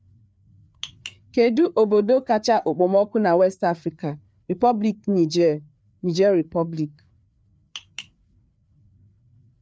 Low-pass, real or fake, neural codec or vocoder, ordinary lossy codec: none; fake; codec, 16 kHz, 4 kbps, FreqCodec, larger model; none